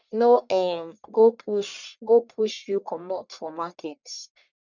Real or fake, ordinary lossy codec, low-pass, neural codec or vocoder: fake; none; 7.2 kHz; codec, 44.1 kHz, 1.7 kbps, Pupu-Codec